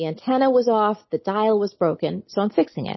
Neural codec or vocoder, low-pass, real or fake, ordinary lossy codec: none; 7.2 kHz; real; MP3, 24 kbps